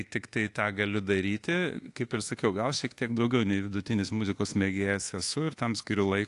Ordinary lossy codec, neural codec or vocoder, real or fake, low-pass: AAC, 48 kbps; codec, 24 kHz, 1.2 kbps, DualCodec; fake; 10.8 kHz